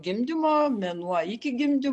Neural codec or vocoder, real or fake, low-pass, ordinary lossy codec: none; real; 10.8 kHz; AAC, 64 kbps